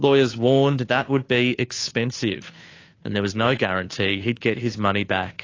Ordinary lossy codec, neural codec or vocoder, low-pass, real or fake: AAC, 32 kbps; codec, 16 kHz, 2 kbps, FunCodec, trained on Chinese and English, 25 frames a second; 7.2 kHz; fake